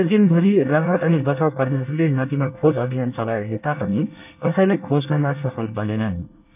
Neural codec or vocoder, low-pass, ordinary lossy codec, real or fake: codec, 24 kHz, 1 kbps, SNAC; 3.6 kHz; none; fake